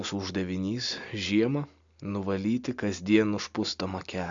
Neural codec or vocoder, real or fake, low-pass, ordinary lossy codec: none; real; 7.2 kHz; AAC, 64 kbps